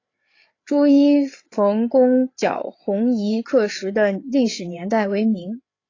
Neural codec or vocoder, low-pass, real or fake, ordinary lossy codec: codec, 16 kHz, 8 kbps, FreqCodec, larger model; 7.2 kHz; fake; AAC, 32 kbps